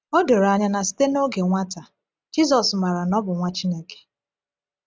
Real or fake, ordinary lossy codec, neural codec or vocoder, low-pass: real; none; none; none